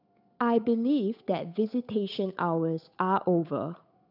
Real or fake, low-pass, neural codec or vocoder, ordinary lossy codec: fake; 5.4 kHz; codec, 16 kHz, 8 kbps, FunCodec, trained on Chinese and English, 25 frames a second; none